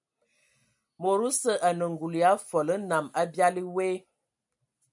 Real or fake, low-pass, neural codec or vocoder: real; 10.8 kHz; none